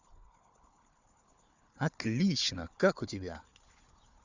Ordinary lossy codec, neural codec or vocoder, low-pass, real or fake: Opus, 64 kbps; codec, 16 kHz, 4 kbps, FunCodec, trained on Chinese and English, 50 frames a second; 7.2 kHz; fake